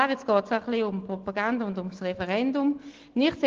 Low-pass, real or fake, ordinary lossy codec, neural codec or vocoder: 7.2 kHz; real; Opus, 16 kbps; none